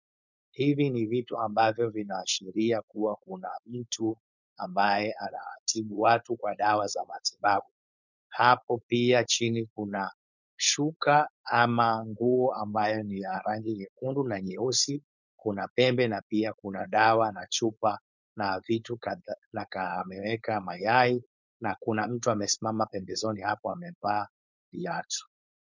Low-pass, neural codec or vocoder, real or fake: 7.2 kHz; codec, 16 kHz, 4.8 kbps, FACodec; fake